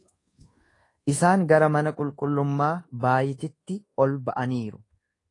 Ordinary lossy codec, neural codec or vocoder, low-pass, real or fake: AAC, 32 kbps; codec, 24 kHz, 1.2 kbps, DualCodec; 10.8 kHz; fake